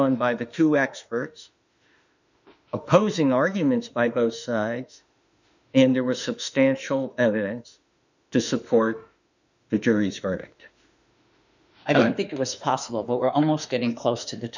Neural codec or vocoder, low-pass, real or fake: autoencoder, 48 kHz, 32 numbers a frame, DAC-VAE, trained on Japanese speech; 7.2 kHz; fake